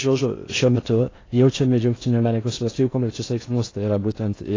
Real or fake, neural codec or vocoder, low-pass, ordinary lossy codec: fake; codec, 16 kHz in and 24 kHz out, 0.6 kbps, FocalCodec, streaming, 4096 codes; 7.2 kHz; AAC, 32 kbps